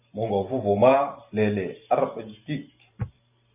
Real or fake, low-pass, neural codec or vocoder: fake; 3.6 kHz; vocoder, 44.1 kHz, 128 mel bands every 512 samples, BigVGAN v2